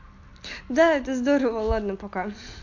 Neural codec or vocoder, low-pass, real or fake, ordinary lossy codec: none; 7.2 kHz; real; AAC, 48 kbps